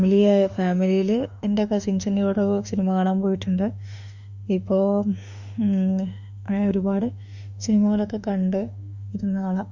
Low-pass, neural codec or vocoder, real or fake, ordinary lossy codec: 7.2 kHz; autoencoder, 48 kHz, 32 numbers a frame, DAC-VAE, trained on Japanese speech; fake; none